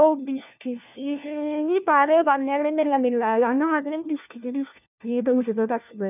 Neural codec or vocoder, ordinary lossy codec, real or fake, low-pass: codec, 16 kHz, 1 kbps, FunCodec, trained on LibriTTS, 50 frames a second; none; fake; 3.6 kHz